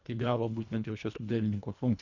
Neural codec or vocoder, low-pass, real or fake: codec, 24 kHz, 1.5 kbps, HILCodec; 7.2 kHz; fake